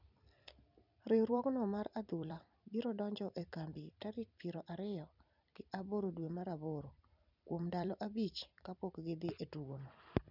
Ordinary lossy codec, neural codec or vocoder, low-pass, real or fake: none; vocoder, 44.1 kHz, 128 mel bands every 256 samples, BigVGAN v2; 5.4 kHz; fake